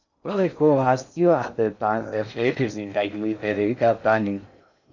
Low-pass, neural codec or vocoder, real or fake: 7.2 kHz; codec, 16 kHz in and 24 kHz out, 0.6 kbps, FocalCodec, streaming, 2048 codes; fake